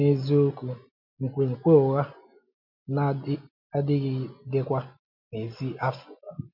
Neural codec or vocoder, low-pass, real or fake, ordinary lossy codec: none; 5.4 kHz; real; MP3, 48 kbps